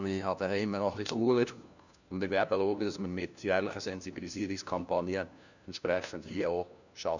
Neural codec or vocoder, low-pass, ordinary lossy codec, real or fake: codec, 16 kHz, 1 kbps, FunCodec, trained on LibriTTS, 50 frames a second; 7.2 kHz; none; fake